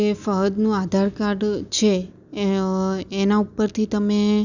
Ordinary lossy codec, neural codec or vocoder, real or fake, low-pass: none; none; real; 7.2 kHz